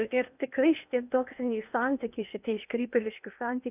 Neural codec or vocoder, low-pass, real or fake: codec, 16 kHz in and 24 kHz out, 0.8 kbps, FocalCodec, streaming, 65536 codes; 3.6 kHz; fake